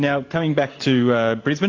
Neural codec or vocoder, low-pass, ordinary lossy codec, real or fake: vocoder, 44.1 kHz, 128 mel bands every 512 samples, BigVGAN v2; 7.2 kHz; AAC, 48 kbps; fake